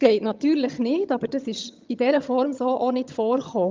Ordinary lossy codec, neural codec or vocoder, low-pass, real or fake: Opus, 32 kbps; vocoder, 22.05 kHz, 80 mel bands, HiFi-GAN; 7.2 kHz; fake